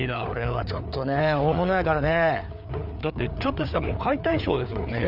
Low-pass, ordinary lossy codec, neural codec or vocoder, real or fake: 5.4 kHz; none; codec, 16 kHz, 4 kbps, FreqCodec, larger model; fake